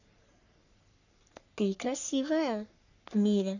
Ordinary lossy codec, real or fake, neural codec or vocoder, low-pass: none; fake; codec, 44.1 kHz, 3.4 kbps, Pupu-Codec; 7.2 kHz